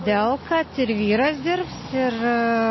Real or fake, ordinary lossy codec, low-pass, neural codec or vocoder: real; MP3, 24 kbps; 7.2 kHz; none